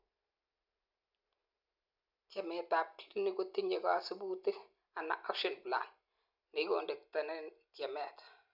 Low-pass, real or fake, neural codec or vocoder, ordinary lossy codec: 5.4 kHz; real; none; none